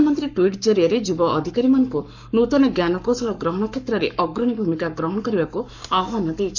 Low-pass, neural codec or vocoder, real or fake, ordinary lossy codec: 7.2 kHz; codec, 44.1 kHz, 7.8 kbps, Pupu-Codec; fake; none